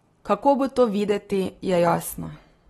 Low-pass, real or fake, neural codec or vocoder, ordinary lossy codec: 19.8 kHz; real; none; AAC, 32 kbps